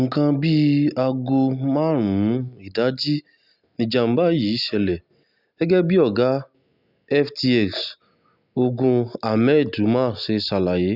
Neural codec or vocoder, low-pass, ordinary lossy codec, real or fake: none; 5.4 kHz; none; real